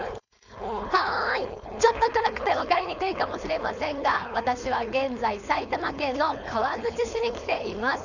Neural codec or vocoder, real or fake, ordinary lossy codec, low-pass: codec, 16 kHz, 4.8 kbps, FACodec; fake; none; 7.2 kHz